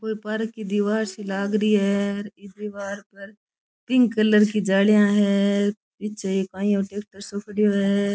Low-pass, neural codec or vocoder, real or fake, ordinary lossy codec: none; none; real; none